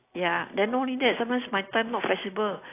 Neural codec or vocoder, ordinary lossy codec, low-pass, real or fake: none; AAC, 24 kbps; 3.6 kHz; real